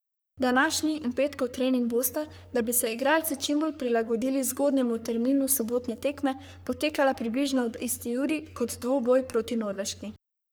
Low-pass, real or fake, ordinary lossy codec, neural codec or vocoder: none; fake; none; codec, 44.1 kHz, 3.4 kbps, Pupu-Codec